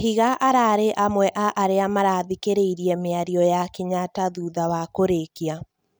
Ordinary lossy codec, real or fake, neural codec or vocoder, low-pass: none; real; none; none